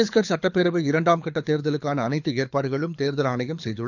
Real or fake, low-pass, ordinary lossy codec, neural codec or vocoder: fake; 7.2 kHz; none; codec, 24 kHz, 6 kbps, HILCodec